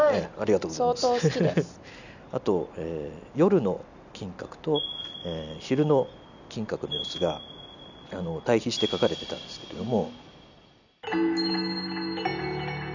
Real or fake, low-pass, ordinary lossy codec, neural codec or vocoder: real; 7.2 kHz; none; none